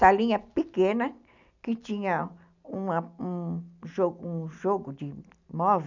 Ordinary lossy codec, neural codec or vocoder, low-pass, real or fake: none; none; 7.2 kHz; real